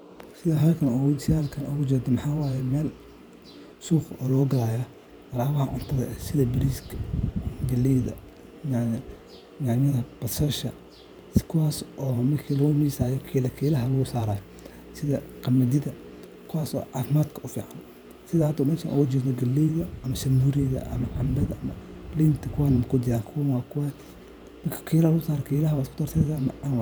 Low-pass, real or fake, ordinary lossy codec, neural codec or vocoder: none; fake; none; vocoder, 44.1 kHz, 128 mel bands every 512 samples, BigVGAN v2